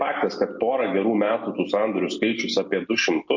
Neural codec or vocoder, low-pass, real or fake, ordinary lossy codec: none; 7.2 kHz; real; MP3, 48 kbps